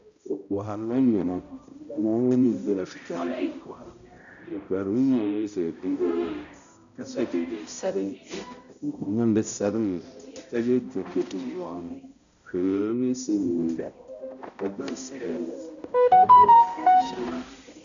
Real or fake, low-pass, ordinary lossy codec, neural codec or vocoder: fake; 7.2 kHz; MP3, 96 kbps; codec, 16 kHz, 0.5 kbps, X-Codec, HuBERT features, trained on balanced general audio